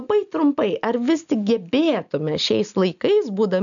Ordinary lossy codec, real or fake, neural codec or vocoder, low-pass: MP3, 96 kbps; real; none; 7.2 kHz